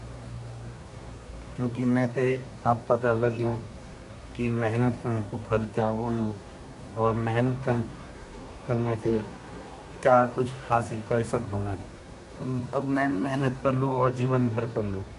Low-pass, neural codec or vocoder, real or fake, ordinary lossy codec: 10.8 kHz; codec, 24 kHz, 1 kbps, SNAC; fake; none